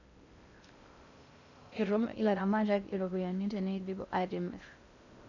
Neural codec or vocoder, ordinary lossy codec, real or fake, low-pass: codec, 16 kHz in and 24 kHz out, 0.6 kbps, FocalCodec, streaming, 2048 codes; none; fake; 7.2 kHz